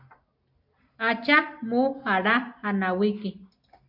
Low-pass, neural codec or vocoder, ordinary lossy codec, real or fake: 5.4 kHz; none; AAC, 48 kbps; real